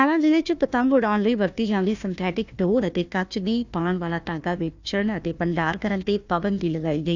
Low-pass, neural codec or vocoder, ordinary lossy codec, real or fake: 7.2 kHz; codec, 16 kHz, 1 kbps, FunCodec, trained on Chinese and English, 50 frames a second; none; fake